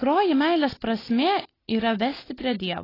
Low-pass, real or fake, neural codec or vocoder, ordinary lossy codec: 5.4 kHz; real; none; AAC, 24 kbps